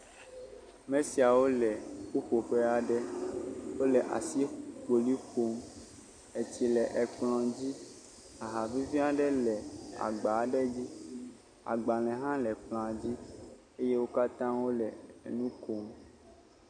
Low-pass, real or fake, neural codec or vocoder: 9.9 kHz; real; none